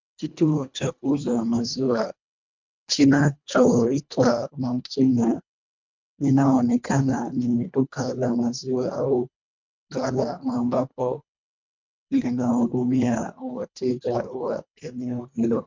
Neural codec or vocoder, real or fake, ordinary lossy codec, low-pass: codec, 24 kHz, 1.5 kbps, HILCodec; fake; MP3, 64 kbps; 7.2 kHz